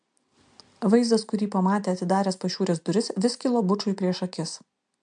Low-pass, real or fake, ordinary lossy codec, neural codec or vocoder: 9.9 kHz; real; MP3, 64 kbps; none